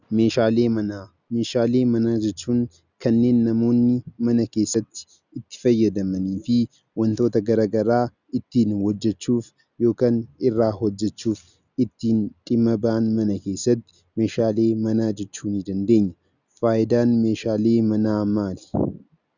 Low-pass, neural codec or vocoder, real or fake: 7.2 kHz; none; real